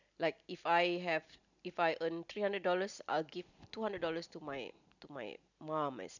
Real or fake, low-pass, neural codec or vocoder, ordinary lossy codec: real; 7.2 kHz; none; none